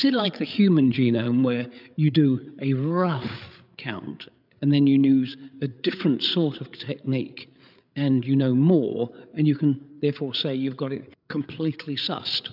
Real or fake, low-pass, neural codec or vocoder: fake; 5.4 kHz; codec, 16 kHz, 8 kbps, FreqCodec, larger model